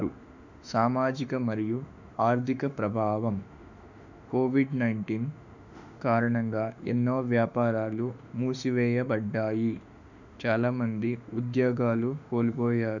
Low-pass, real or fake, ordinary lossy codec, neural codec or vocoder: 7.2 kHz; fake; none; autoencoder, 48 kHz, 32 numbers a frame, DAC-VAE, trained on Japanese speech